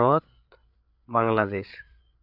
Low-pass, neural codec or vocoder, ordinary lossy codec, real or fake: 5.4 kHz; codec, 16 kHz, 8 kbps, FreqCodec, larger model; none; fake